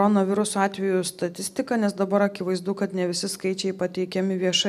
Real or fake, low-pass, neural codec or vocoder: real; 14.4 kHz; none